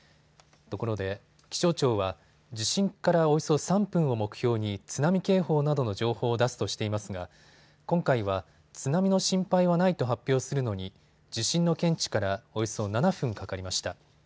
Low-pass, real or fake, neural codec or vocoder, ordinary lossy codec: none; real; none; none